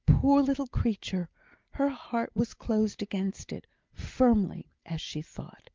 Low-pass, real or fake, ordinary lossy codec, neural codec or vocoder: 7.2 kHz; real; Opus, 32 kbps; none